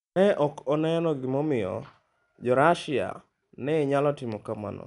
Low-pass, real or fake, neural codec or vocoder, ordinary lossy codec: 10.8 kHz; real; none; none